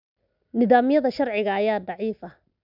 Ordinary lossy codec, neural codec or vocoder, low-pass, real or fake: none; none; 5.4 kHz; real